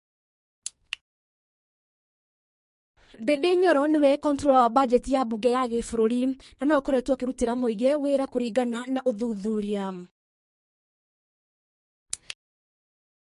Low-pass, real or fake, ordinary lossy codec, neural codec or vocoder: 14.4 kHz; fake; MP3, 48 kbps; codec, 44.1 kHz, 2.6 kbps, SNAC